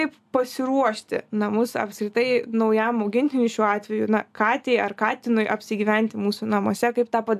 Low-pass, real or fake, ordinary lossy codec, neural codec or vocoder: 14.4 kHz; real; AAC, 96 kbps; none